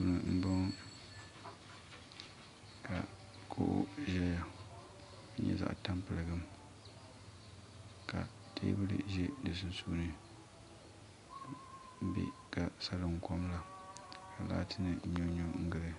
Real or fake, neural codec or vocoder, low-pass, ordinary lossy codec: real; none; 10.8 kHz; AAC, 64 kbps